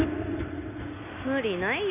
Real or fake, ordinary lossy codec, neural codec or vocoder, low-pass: real; none; none; 3.6 kHz